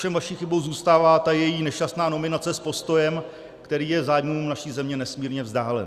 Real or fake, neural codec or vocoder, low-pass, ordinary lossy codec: real; none; 14.4 kHz; Opus, 64 kbps